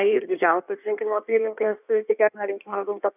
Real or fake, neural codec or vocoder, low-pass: fake; codec, 16 kHz in and 24 kHz out, 1.1 kbps, FireRedTTS-2 codec; 3.6 kHz